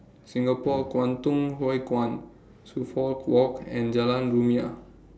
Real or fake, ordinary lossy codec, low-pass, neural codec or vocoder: real; none; none; none